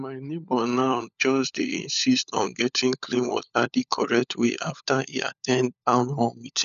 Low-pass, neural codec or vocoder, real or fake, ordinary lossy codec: 7.2 kHz; codec, 16 kHz, 16 kbps, FunCodec, trained on LibriTTS, 50 frames a second; fake; none